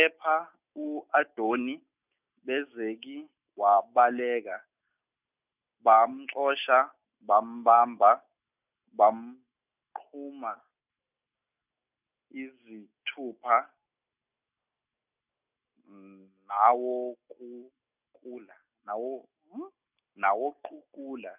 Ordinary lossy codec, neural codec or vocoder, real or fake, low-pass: none; none; real; 3.6 kHz